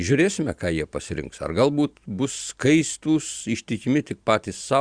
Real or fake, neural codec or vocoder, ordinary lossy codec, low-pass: fake; vocoder, 44.1 kHz, 128 mel bands every 512 samples, BigVGAN v2; Opus, 64 kbps; 9.9 kHz